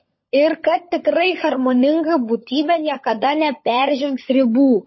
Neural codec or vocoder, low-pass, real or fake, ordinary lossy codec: codec, 16 kHz, 16 kbps, FunCodec, trained on LibriTTS, 50 frames a second; 7.2 kHz; fake; MP3, 24 kbps